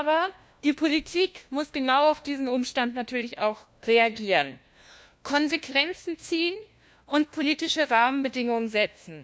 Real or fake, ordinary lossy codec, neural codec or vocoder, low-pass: fake; none; codec, 16 kHz, 1 kbps, FunCodec, trained on LibriTTS, 50 frames a second; none